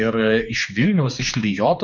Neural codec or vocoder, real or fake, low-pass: codec, 16 kHz, 2 kbps, X-Codec, HuBERT features, trained on general audio; fake; 7.2 kHz